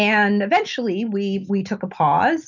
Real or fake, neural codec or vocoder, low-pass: real; none; 7.2 kHz